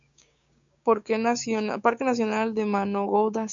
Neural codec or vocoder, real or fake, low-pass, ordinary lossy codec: codec, 16 kHz, 6 kbps, DAC; fake; 7.2 kHz; MP3, 64 kbps